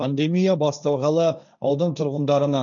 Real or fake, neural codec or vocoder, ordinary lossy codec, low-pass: fake; codec, 16 kHz, 1.1 kbps, Voila-Tokenizer; none; 7.2 kHz